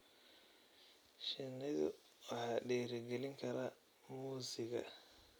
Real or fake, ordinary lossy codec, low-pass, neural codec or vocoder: real; none; none; none